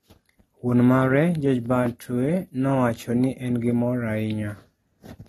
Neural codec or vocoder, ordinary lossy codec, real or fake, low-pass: none; AAC, 32 kbps; real; 19.8 kHz